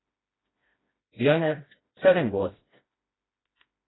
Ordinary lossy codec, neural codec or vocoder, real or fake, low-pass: AAC, 16 kbps; codec, 16 kHz, 1 kbps, FreqCodec, smaller model; fake; 7.2 kHz